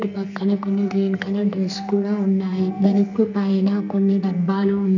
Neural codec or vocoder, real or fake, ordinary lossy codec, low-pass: codec, 44.1 kHz, 2.6 kbps, SNAC; fake; none; 7.2 kHz